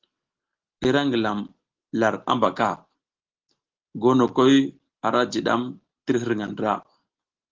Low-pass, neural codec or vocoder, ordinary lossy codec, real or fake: 7.2 kHz; none; Opus, 16 kbps; real